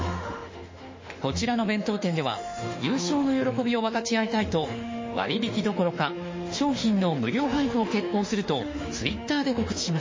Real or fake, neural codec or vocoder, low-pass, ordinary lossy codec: fake; autoencoder, 48 kHz, 32 numbers a frame, DAC-VAE, trained on Japanese speech; 7.2 kHz; MP3, 32 kbps